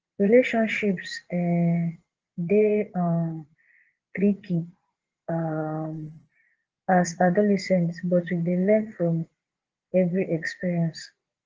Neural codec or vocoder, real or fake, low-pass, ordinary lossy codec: vocoder, 22.05 kHz, 80 mel bands, Vocos; fake; 7.2 kHz; Opus, 16 kbps